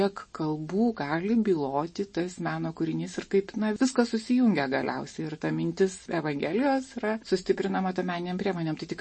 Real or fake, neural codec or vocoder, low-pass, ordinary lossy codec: real; none; 10.8 kHz; MP3, 32 kbps